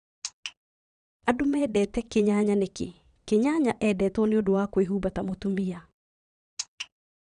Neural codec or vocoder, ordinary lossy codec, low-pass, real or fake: vocoder, 22.05 kHz, 80 mel bands, Vocos; none; 9.9 kHz; fake